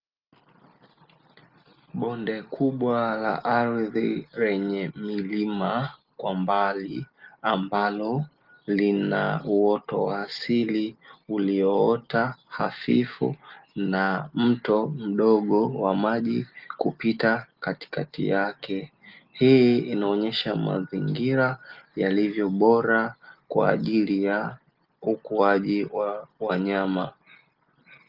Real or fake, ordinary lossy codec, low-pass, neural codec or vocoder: real; Opus, 32 kbps; 5.4 kHz; none